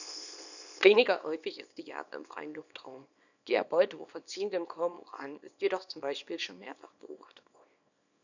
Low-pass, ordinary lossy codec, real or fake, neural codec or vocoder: 7.2 kHz; none; fake; codec, 24 kHz, 0.9 kbps, WavTokenizer, small release